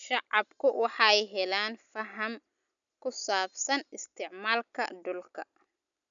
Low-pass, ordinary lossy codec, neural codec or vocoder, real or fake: 7.2 kHz; none; none; real